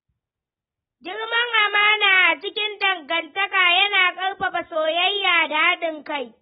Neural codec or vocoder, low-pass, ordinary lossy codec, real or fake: none; 7.2 kHz; AAC, 16 kbps; real